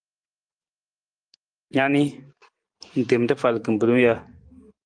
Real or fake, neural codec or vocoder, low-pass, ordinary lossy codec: fake; vocoder, 44.1 kHz, 128 mel bands every 512 samples, BigVGAN v2; 9.9 kHz; Opus, 32 kbps